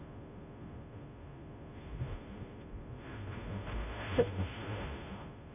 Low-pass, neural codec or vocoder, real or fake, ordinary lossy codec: 3.6 kHz; codec, 16 kHz, 0.5 kbps, FunCodec, trained on Chinese and English, 25 frames a second; fake; AAC, 32 kbps